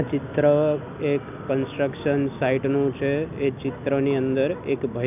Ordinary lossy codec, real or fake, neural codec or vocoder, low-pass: none; real; none; 3.6 kHz